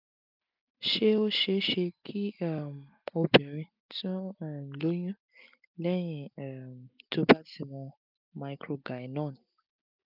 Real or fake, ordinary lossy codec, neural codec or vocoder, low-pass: real; none; none; 5.4 kHz